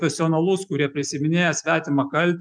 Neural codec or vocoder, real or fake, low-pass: none; real; 9.9 kHz